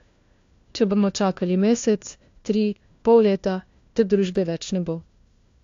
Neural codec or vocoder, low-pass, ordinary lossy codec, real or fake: codec, 16 kHz, 1 kbps, FunCodec, trained on LibriTTS, 50 frames a second; 7.2 kHz; AAC, 48 kbps; fake